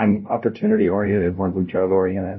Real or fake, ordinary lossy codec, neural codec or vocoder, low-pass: fake; MP3, 24 kbps; codec, 16 kHz, 0.5 kbps, FunCodec, trained on LibriTTS, 25 frames a second; 7.2 kHz